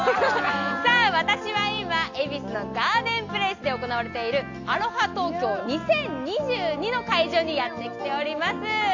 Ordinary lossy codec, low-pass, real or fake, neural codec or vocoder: AAC, 48 kbps; 7.2 kHz; real; none